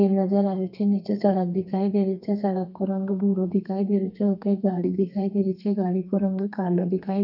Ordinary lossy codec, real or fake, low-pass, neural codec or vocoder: none; fake; 5.4 kHz; codec, 32 kHz, 1.9 kbps, SNAC